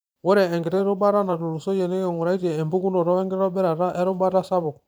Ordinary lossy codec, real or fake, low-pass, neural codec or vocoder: none; fake; none; vocoder, 44.1 kHz, 128 mel bands every 512 samples, BigVGAN v2